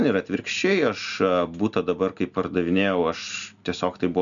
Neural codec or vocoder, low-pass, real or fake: none; 7.2 kHz; real